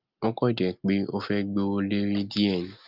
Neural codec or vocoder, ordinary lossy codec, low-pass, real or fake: none; Opus, 32 kbps; 5.4 kHz; real